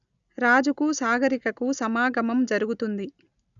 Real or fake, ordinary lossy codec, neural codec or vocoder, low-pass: real; none; none; 7.2 kHz